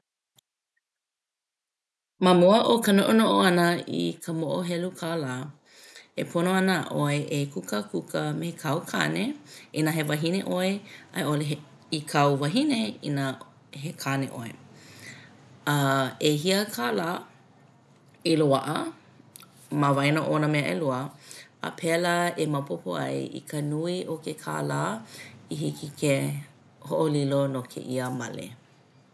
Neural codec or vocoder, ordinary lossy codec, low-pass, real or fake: none; none; none; real